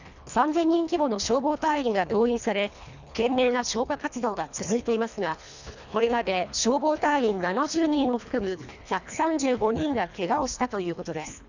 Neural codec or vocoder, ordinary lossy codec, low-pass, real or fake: codec, 24 kHz, 1.5 kbps, HILCodec; none; 7.2 kHz; fake